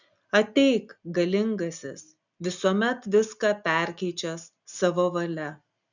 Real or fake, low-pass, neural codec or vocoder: real; 7.2 kHz; none